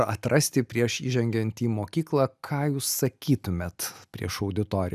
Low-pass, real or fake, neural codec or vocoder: 14.4 kHz; real; none